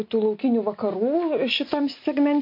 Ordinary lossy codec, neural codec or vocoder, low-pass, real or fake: MP3, 32 kbps; none; 5.4 kHz; real